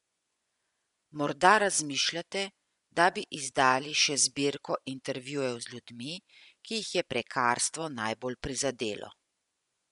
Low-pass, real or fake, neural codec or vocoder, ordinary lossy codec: 10.8 kHz; fake; vocoder, 24 kHz, 100 mel bands, Vocos; none